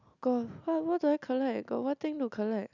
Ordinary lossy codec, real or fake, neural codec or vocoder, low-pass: none; real; none; 7.2 kHz